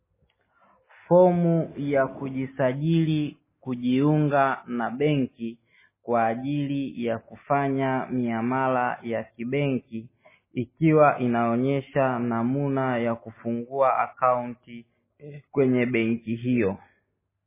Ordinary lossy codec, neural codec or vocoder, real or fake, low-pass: MP3, 16 kbps; none; real; 3.6 kHz